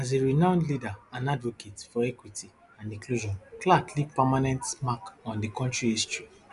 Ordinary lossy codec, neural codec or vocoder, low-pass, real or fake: none; none; 10.8 kHz; real